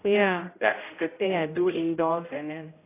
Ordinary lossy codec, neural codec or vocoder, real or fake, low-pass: AAC, 24 kbps; codec, 16 kHz, 0.5 kbps, X-Codec, HuBERT features, trained on general audio; fake; 3.6 kHz